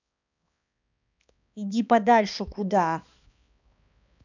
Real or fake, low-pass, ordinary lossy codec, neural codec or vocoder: fake; 7.2 kHz; none; codec, 16 kHz, 2 kbps, X-Codec, HuBERT features, trained on balanced general audio